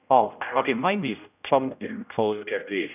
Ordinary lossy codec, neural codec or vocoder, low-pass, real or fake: none; codec, 16 kHz, 0.5 kbps, X-Codec, HuBERT features, trained on general audio; 3.6 kHz; fake